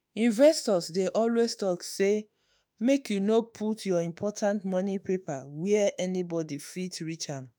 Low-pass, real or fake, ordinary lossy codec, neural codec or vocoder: none; fake; none; autoencoder, 48 kHz, 32 numbers a frame, DAC-VAE, trained on Japanese speech